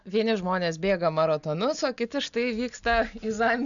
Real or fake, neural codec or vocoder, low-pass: real; none; 7.2 kHz